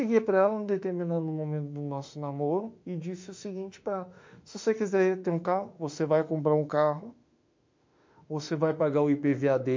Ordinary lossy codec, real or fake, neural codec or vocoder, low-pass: MP3, 48 kbps; fake; autoencoder, 48 kHz, 32 numbers a frame, DAC-VAE, trained on Japanese speech; 7.2 kHz